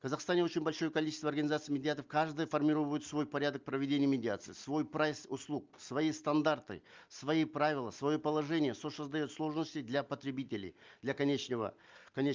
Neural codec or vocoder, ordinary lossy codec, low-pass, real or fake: none; Opus, 32 kbps; 7.2 kHz; real